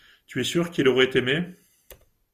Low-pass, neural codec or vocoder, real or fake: 14.4 kHz; none; real